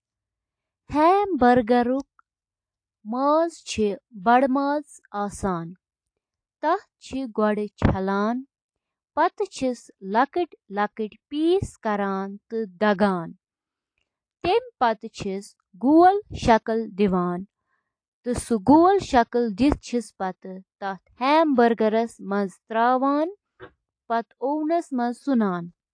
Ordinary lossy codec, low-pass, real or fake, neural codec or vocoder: AAC, 48 kbps; 9.9 kHz; real; none